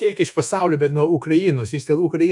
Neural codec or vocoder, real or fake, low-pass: codec, 24 kHz, 1.2 kbps, DualCodec; fake; 10.8 kHz